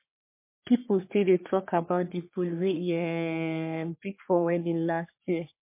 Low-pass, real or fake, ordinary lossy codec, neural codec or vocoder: 3.6 kHz; fake; MP3, 24 kbps; codec, 44.1 kHz, 3.4 kbps, Pupu-Codec